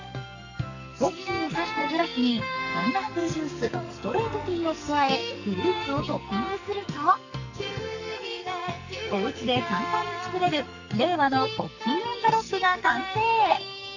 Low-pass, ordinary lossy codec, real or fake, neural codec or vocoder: 7.2 kHz; none; fake; codec, 44.1 kHz, 2.6 kbps, SNAC